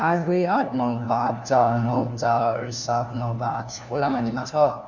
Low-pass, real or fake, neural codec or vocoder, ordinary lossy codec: 7.2 kHz; fake; codec, 16 kHz, 1 kbps, FunCodec, trained on LibriTTS, 50 frames a second; none